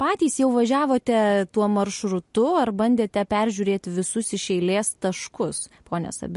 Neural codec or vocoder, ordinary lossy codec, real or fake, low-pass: none; MP3, 48 kbps; real; 14.4 kHz